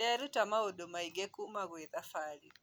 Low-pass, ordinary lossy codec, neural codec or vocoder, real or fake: none; none; none; real